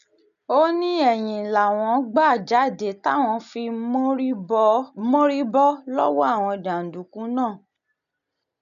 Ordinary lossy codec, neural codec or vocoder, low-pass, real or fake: none; none; 7.2 kHz; real